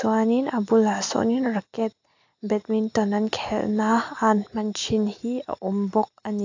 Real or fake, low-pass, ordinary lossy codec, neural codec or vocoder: real; 7.2 kHz; AAC, 48 kbps; none